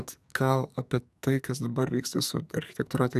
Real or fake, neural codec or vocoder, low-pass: fake; codec, 44.1 kHz, 2.6 kbps, SNAC; 14.4 kHz